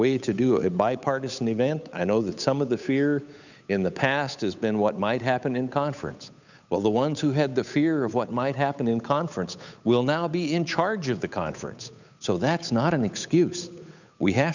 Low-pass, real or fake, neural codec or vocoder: 7.2 kHz; fake; codec, 16 kHz, 8 kbps, FunCodec, trained on Chinese and English, 25 frames a second